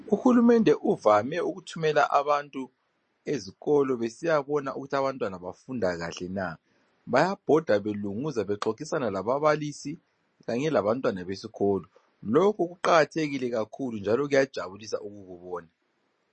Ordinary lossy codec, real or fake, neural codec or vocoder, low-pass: MP3, 32 kbps; real; none; 9.9 kHz